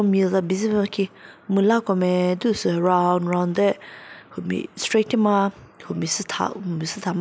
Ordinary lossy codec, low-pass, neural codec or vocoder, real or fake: none; none; none; real